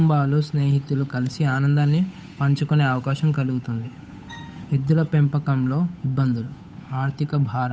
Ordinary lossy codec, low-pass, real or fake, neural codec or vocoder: none; none; fake; codec, 16 kHz, 8 kbps, FunCodec, trained on Chinese and English, 25 frames a second